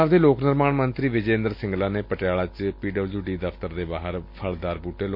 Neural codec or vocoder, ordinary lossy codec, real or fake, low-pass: none; MP3, 32 kbps; real; 5.4 kHz